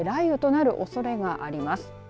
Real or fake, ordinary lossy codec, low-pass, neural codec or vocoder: real; none; none; none